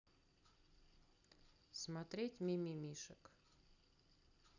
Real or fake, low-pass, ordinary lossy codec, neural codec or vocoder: real; 7.2 kHz; none; none